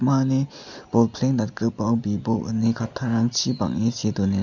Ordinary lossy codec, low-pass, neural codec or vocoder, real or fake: none; 7.2 kHz; none; real